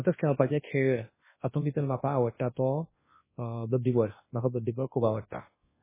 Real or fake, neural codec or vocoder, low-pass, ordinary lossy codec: fake; codec, 24 kHz, 0.9 kbps, WavTokenizer, large speech release; 3.6 kHz; MP3, 16 kbps